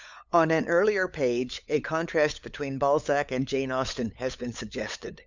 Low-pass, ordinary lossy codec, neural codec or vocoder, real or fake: 7.2 kHz; Opus, 64 kbps; codec, 16 kHz, 8 kbps, FunCodec, trained on LibriTTS, 25 frames a second; fake